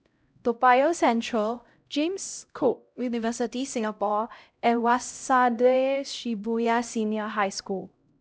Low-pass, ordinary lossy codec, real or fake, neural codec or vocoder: none; none; fake; codec, 16 kHz, 0.5 kbps, X-Codec, HuBERT features, trained on LibriSpeech